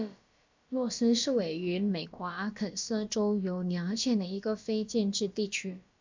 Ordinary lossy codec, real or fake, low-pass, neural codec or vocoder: MP3, 64 kbps; fake; 7.2 kHz; codec, 16 kHz, about 1 kbps, DyCAST, with the encoder's durations